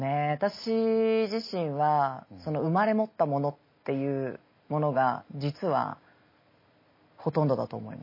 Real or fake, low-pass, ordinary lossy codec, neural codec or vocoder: real; 5.4 kHz; MP3, 24 kbps; none